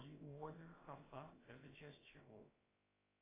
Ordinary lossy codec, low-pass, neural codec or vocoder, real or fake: AAC, 16 kbps; 3.6 kHz; codec, 16 kHz, about 1 kbps, DyCAST, with the encoder's durations; fake